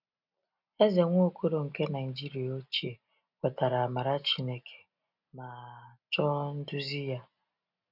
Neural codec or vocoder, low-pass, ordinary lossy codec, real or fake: none; 5.4 kHz; none; real